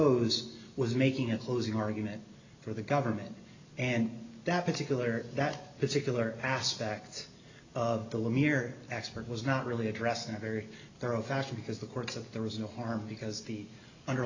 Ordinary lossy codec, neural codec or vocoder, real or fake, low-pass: AAC, 48 kbps; none; real; 7.2 kHz